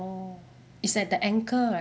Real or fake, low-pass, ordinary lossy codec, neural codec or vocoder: real; none; none; none